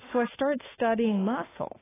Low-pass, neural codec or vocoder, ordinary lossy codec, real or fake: 3.6 kHz; autoencoder, 48 kHz, 32 numbers a frame, DAC-VAE, trained on Japanese speech; AAC, 16 kbps; fake